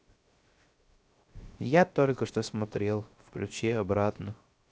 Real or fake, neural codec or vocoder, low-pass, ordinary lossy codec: fake; codec, 16 kHz, 0.7 kbps, FocalCodec; none; none